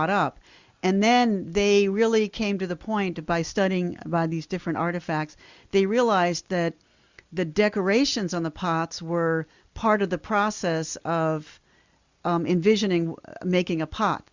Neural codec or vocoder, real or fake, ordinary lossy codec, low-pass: none; real; Opus, 64 kbps; 7.2 kHz